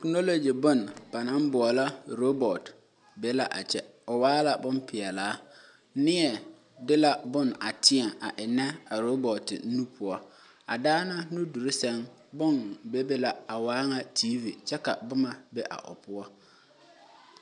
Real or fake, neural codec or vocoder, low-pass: real; none; 10.8 kHz